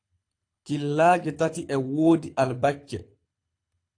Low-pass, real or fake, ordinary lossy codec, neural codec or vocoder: 9.9 kHz; fake; AAC, 48 kbps; codec, 24 kHz, 6 kbps, HILCodec